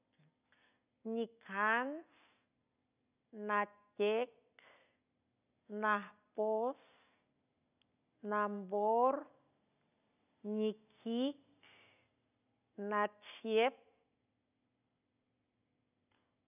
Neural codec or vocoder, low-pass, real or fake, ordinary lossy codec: none; 3.6 kHz; real; none